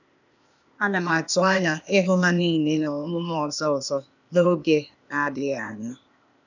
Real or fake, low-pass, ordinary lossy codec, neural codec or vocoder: fake; 7.2 kHz; none; codec, 16 kHz, 0.8 kbps, ZipCodec